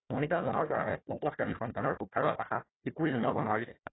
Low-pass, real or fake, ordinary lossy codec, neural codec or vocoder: 7.2 kHz; fake; AAC, 16 kbps; codec, 16 kHz, 1 kbps, FunCodec, trained on Chinese and English, 50 frames a second